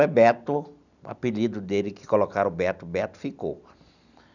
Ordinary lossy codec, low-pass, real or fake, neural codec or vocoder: none; 7.2 kHz; real; none